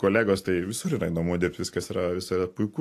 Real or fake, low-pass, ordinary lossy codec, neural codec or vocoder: real; 14.4 kHz; AAC, 64 kbps; none